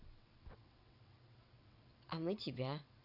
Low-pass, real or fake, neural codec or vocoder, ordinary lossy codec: 5.4 kHz; fake; vocoder, 22.05 kHz, 80 mel bands, WaveNeXt; none